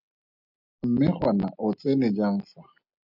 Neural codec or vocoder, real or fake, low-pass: none; real; 5.4 kHz